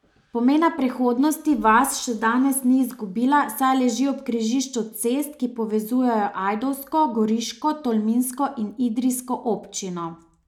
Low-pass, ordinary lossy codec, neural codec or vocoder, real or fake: 19.8 kHz; none; none; real